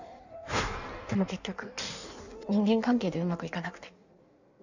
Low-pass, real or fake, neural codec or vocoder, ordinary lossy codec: 7.2 kHz; fake; codec, 16 kHz in and 24 kHz out, 1.1 kbps, FireRedTTS-2 codec; none